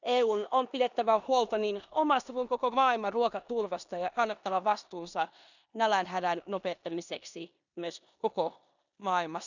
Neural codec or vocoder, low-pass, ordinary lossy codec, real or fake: codec, 16 kHz in and 24 kHz out, 0.9 kbps, LongCat-Audio-Codec, four codebook decoder; 7.2 kHz; none; fake